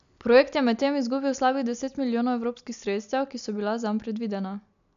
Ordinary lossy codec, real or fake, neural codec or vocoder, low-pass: none; real; none; 7.2 kHz